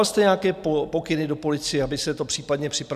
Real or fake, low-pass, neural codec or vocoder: real; 14.4 kHz; none